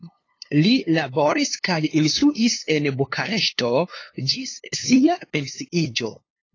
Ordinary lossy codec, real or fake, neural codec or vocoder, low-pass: AAC, 32 kbps; fake; codec, 16 kHz, 2 kbps, FunCodec, trained on LibriTTS, 25 frames a second; 7.2 kHz